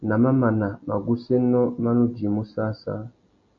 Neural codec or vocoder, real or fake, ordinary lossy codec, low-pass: none; real; MP3, 48 kbps; 7.2 kHz